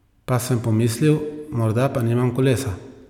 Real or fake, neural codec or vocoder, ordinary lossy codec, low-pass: real; none; none; 19.8 kHz